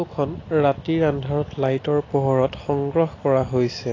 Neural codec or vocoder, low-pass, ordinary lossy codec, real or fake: none; 7.2 kHz; AAC, 32 kbps; real